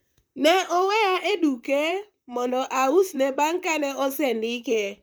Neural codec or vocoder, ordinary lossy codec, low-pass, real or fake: vocoder, 44.1 kHz, 128 mel bands, Pupu-Vocoder; none; none; fake